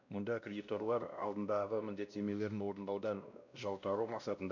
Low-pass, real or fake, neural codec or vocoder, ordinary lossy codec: 7.2 kHz; fake; codec, 16 kHz, 1 kbps, X-Codec, WavLM features, trained on Multilingual LibriSpeech; none